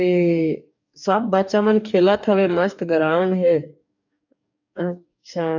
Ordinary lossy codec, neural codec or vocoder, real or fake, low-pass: none; codec, 44.1 kHz, 2.6 kbps, DAC; fake; 7.2 kHz